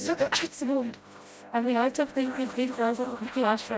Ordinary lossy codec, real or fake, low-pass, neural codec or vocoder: none; fake; none; codec, 16 kHz, 0.5 kbps, FreqCodec, smaller model